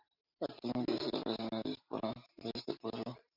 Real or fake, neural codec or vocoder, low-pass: fake; vocoder, 44.1 kHz, 128 mel bands every 512 samples, BigVGAN v2; 5.4 kHz